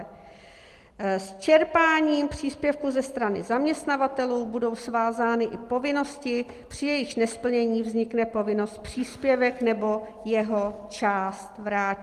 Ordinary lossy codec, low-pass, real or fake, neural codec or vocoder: Opus, 24 kbps; 14.4 kHz; real; none